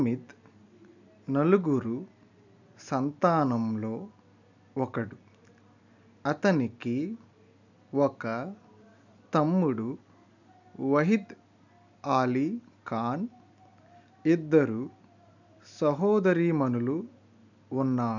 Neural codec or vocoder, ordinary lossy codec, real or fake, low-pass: none; none; real; 7.2 kHz